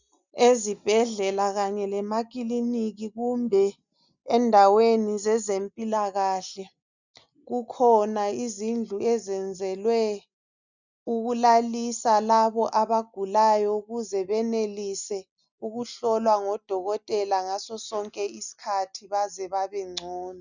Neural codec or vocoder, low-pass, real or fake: none; 7.2 kHz; real